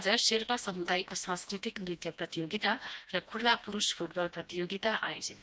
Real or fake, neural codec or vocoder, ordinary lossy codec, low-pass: fake; codec, 16 kHz, 1 kbps, FreqCodec, smaller model; none; none